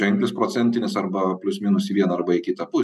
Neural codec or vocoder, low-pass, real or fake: none; 14.4 kHz; real